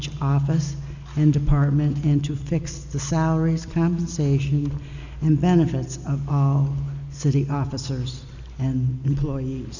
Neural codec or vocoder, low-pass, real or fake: none; 7.2 kHz; real